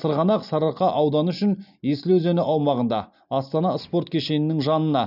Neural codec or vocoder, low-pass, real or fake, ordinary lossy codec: none; 5.4 kHz; real; none